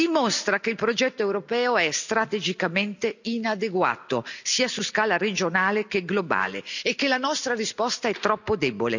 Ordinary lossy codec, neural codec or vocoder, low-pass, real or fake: none; none; 7.2 kHz; real